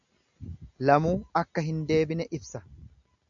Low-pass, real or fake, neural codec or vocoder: 7.2 kHz; real; none